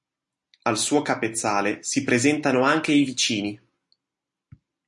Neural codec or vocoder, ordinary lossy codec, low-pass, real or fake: none; MP3, 48 kbps; 10.8 kHz; real